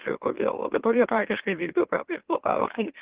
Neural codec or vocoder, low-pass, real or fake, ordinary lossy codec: autoencoder, 44.1 kHz, a latent of 192 numbers a frame, MeloTTS; 3.6 kHz; fake; Opus, 16 kbps